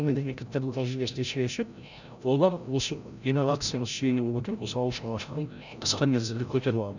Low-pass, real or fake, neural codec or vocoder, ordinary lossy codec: 7.2 kHz; fake; codec, 16 kHz, 0.5 kbps, FreqCodec, larger model; none